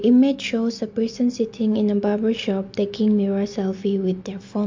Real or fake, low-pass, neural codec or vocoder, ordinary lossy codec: real; 7.2 kHz; none; MP3, 48 kbps